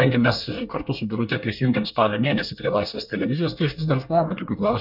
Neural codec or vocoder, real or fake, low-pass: codec, 24 kHz, 1 kbps, SNAC; fake; 5.4 kHz